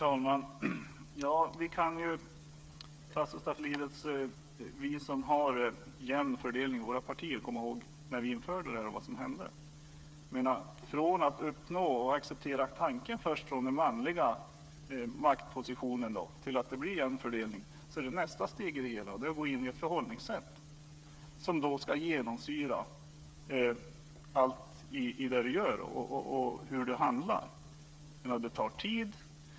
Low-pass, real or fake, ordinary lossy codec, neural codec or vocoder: none; fake; none; codec, 16 kHz, 8 kbps, FreqCodec, smaller model